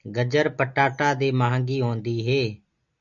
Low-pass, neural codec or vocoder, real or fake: 7.2 kHz; none; real